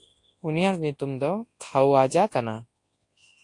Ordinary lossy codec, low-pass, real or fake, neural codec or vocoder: AAC, 48 kbps; 10.8 kHz; fake; codec, 24 kHz, 0.9 kbps, WavTokenizer, large speech release